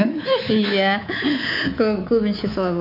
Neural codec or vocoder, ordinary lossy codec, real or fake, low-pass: codec, 24 kHz, 3.1 kbps, DualCodec; none; fake; 5.4 kHz